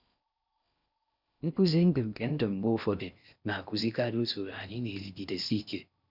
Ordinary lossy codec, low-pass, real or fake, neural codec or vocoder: none; 5.4 kHz; fake; codec, 16 kHz in and 24 kHz out, 0.6 kbps, FocalCodec, streaming, 4096 codes